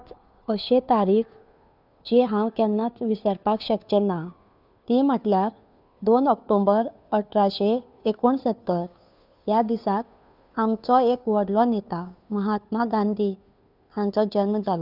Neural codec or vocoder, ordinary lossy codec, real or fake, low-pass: codec, 16 kHz, 2 kbps, FunCodec, trained on Chinese and English, 25 frames a second; none; fake; 5.4 kHz